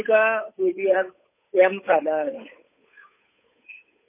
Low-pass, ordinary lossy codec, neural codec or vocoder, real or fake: 3.6 kHz; MP3, 24 kbps; codec, 16 kHz, 8 kbps, FunCodec, trained on Chinese and English, 25 frames a second; fake